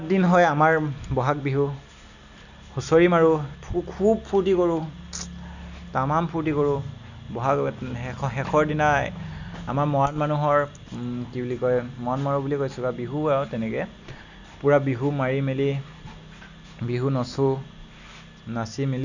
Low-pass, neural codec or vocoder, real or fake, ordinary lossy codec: 7.2 kHz; none; real; none